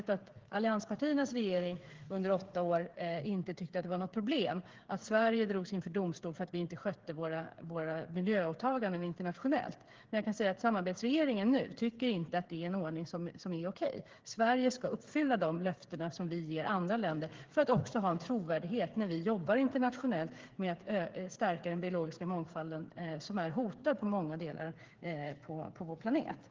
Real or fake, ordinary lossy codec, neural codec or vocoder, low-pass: fake; Opus, 16 kbps; codec, 16 kHz, 8 kbps, FreqCodec, smaller model; 7.2 kHz